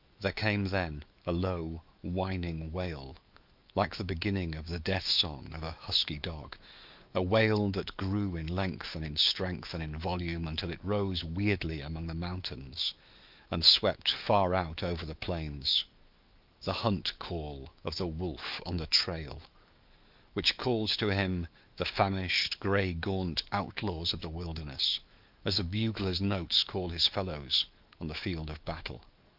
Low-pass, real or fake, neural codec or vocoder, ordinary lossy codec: 5.4 kHz; fake; autoencoder, 48 kHz, 128 numbers a frame, DAC-VAE, trained on Japanese speech; Opus, 32 kbps